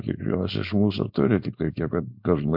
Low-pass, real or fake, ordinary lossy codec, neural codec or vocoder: 5.4 kHz; fake; MP3, 48 kbps; codec, 16 kHz, 4.8 kbps, FACodec